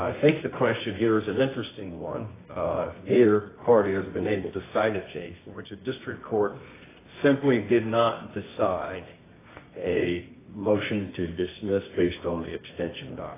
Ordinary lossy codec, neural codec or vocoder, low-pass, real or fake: AAC, 16 kbps; codec, 24 kHz, 0.9 kbps, WavTokenizer, medium music audio release; 3.6 kHz; fake